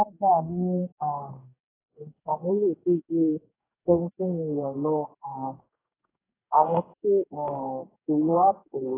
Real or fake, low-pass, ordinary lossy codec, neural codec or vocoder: fake; 3.6 kHz; AAC, 16 kbps; codec, 44.1 kHz, 2.6 kbps, DAC